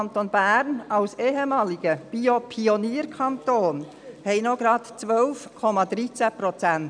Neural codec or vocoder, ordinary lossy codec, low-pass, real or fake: none; none; 9.9 kHz; real